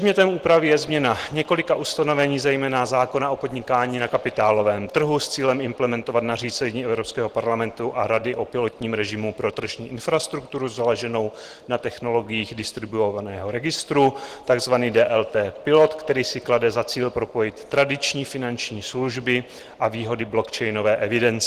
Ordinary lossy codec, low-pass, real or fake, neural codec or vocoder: Opus, 16 kbps; 14.4 kHz; real; none